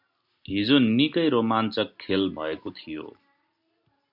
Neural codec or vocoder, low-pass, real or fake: none; 5.4 kHz; real